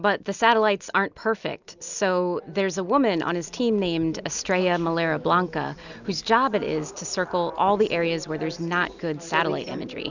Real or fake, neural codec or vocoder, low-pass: real; none; 7.2 kHz